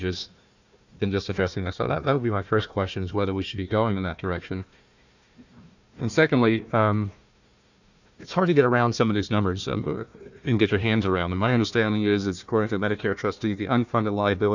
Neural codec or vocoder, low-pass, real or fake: codec, 16 kHz, 1 kbps, FunCodec, trained on Chinese and English, 50 frames a second; 7.2 kHz; fake